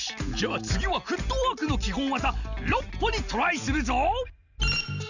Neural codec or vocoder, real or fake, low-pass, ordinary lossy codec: none; real; 7.2 kHz; none